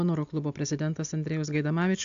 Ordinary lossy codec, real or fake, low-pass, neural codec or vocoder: AAC, 64 kbps; real; 7.2 kHz; none